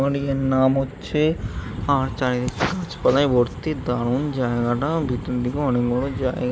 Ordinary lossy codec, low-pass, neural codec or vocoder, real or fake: none; none; none; real